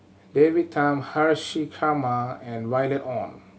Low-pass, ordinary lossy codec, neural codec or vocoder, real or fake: none; none; none; real